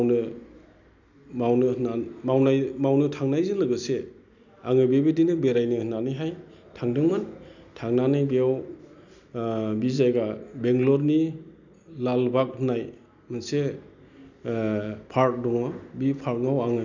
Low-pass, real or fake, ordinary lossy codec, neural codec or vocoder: 7.2 kHz; real; none; none